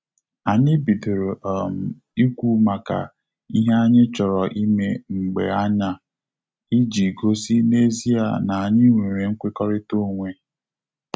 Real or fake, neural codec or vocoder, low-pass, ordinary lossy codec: real; none; none; none